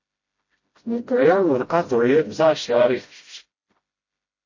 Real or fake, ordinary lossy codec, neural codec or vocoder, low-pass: fake; MP3, 32 kbps; codec, 16 kHz, 0.5 kbps, FreqCodec, smaller model; 7.2 kHz